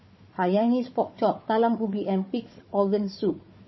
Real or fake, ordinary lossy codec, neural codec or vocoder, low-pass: fake; MP3, 24 kbps; codec, 16 kHz, 4 kbps, FunCodec, trained on Chinese and English, 50 frames a second; 7.2 kHz